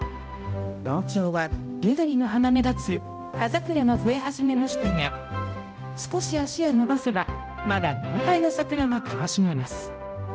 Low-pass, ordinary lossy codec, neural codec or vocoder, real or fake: none; none; codec, 16 kHz, 0.5 kbps, X-Codec, HuBERT features, trained on balanced general audio; fake